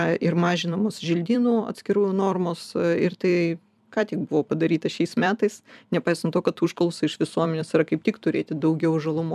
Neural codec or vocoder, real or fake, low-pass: vocoder, 44.1 kHz, 128 mel bands every 256 samples, BigVGAN v2; fake; 14.4 kHz